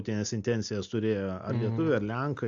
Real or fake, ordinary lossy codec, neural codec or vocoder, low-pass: real; AAC, 64 kbps; none; 7.2 kHz